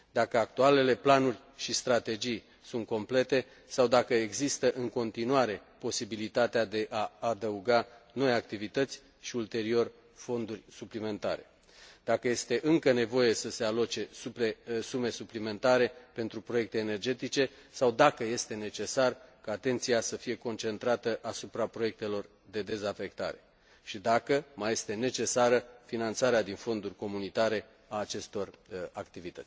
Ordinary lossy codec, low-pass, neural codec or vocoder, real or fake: none; none; none; real